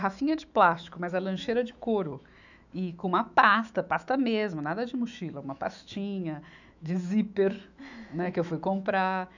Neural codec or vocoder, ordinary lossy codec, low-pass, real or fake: autoencoder, 48 kHz, 128 numbers a frame, DAC-VAE, trained on Japanese speech; none; 7.2 kHz; fake